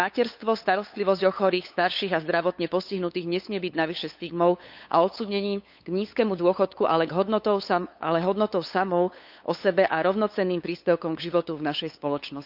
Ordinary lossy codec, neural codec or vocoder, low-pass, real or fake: none; codec, 16 kHz, 8 kbps, FunCodec, trained on LibriTTS, 25 frames a second; 5.4 kHz; fake